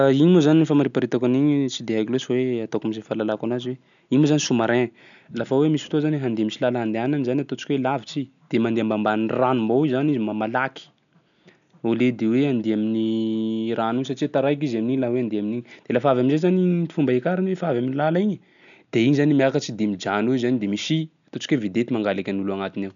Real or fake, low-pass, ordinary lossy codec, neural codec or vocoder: real; 7.2 kHz; none; none